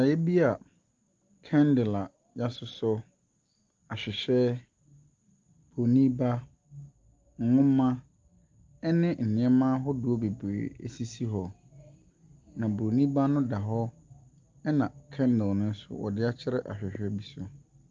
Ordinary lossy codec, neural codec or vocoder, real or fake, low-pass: Opus, 32 kbps; none; real; 7.2 kHz